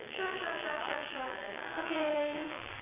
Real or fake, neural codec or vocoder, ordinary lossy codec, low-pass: fake; vocoder, 22.05 kHz, 80 mel bands, WaveNeXt; AAC, 32 kbps; 3.6 kHz